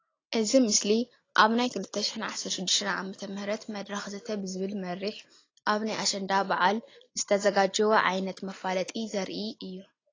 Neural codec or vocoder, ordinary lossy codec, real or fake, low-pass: none; AAC, 32 kbps; real; 7.2 kHz